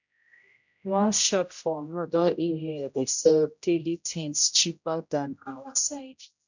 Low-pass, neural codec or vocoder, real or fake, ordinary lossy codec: 7.2 kHz; codec, 16 kHz, 0.5 kbps, X-Codec, HuBERT features, trained on general audio; fake; none